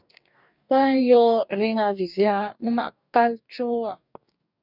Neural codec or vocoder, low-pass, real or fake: codec, 44.1 kHz, 2.6 kbps, DAC; 5.4 kHz; fake